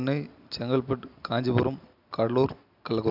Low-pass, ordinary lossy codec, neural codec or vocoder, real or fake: 5.4 kHz; none; none; real